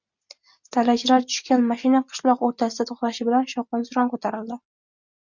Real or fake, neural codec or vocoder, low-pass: real; none; 7.2 kHz